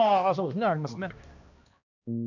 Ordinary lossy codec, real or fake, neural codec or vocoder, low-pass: none; fake; codec, 16 kHz, 1 kbps, X-Codec, HuBERT features, trained on balanced general audio; 7.2 kHz